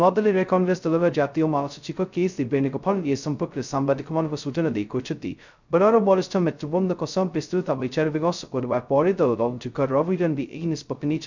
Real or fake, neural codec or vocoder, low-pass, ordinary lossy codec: fake; codec, 16 kHz, 0.2 kbps, FocalCodec; 7.2 kHz; none